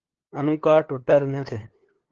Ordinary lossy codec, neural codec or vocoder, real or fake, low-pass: Opus, 16 kbps; codec, 16 kHz, 2 kbps, FunCodec, trained on LibriTTS, 25 frames a second; fake; 7.2 kHz